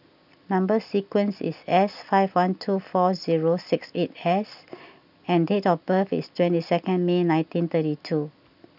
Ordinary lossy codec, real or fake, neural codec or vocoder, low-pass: none; real; none; 5.4 kHz